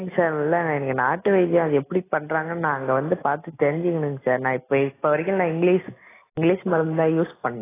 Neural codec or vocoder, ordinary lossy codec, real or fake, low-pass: none; AAC, 16 kbps; real; 3.6 kHz